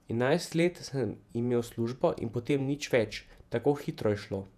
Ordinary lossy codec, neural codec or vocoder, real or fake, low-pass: none; vocoder, 48 kHz, 128 mel bands, Vocos; fake; 14.4 kHz